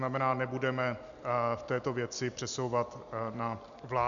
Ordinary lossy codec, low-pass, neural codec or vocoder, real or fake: MP3, 96 kbps; 7.2 kHz; none; real